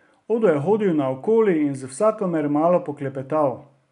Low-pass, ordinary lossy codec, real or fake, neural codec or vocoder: 10.8 kHz; none; real; none